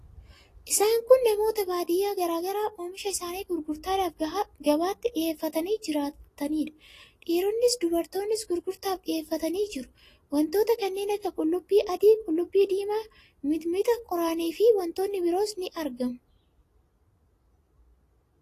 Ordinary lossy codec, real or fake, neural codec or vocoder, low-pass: AAC, 48 kbps; real; none; 14.4 kHz